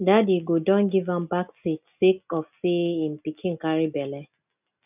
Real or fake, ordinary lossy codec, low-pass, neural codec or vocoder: real; none; 3.6 kHz; none